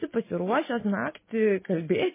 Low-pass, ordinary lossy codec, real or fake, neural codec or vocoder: 3.6 kHz; MP3, 16 kbps; real; none